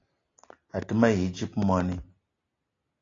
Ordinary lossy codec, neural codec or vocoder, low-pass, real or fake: AAC, 32 kbps; none; 7.2 kHz; real